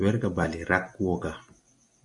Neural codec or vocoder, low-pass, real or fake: none; 10.8 kHz; real